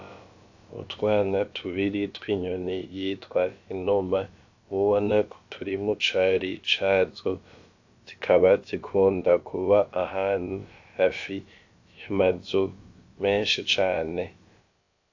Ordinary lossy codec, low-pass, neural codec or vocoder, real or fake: AAC, 48 kbps; 7.2 kHz; codec, 16 kHz, about 1 kbps, DyCAST, with the encoder's durations; fake